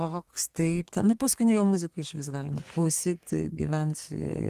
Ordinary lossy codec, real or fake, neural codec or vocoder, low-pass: Opus, 24 kbps; fake; codec, 32 kHz, 1.9 kbps, SNAC; 14.4 kHz